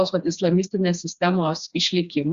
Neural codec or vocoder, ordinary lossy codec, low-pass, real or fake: codec, 16 kHz, 2 kbps, FreqCodec, smaller model; Opus, 64 kbps; 7.2 kHz; fake